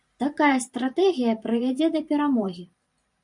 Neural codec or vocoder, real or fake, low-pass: vocoder, 44.1 kHz, 128 mel bands every 512 samples, BigVGAN v2; fake; 10.8 kHz